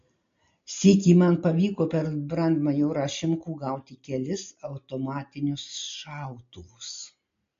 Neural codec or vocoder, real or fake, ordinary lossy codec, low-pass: none; real; MP3, 48 kbps; 7.2 kHz